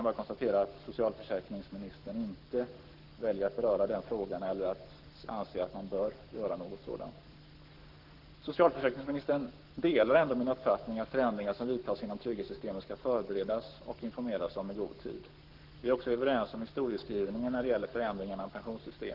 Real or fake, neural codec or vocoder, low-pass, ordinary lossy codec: fake; codec, 44.1 kHz, 7.8 kbps, Pupu-Codec; 5.4 kHz; Opus, 16 kbps